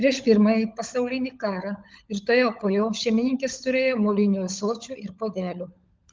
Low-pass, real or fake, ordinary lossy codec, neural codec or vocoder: 7.2 kHz; fake; Opus, 32 kbps; codec, 16 kHz, 16 kbps, FunCodec, trained on LibriTTS, 50 frames a second